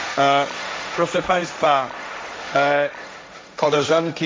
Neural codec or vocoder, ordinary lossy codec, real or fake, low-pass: codec, 16 kHz, 1.1 kbps, Voila-Tokenizer; none; fake; none